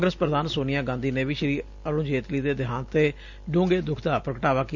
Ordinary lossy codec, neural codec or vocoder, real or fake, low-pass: none; none; real; 7.2 kHz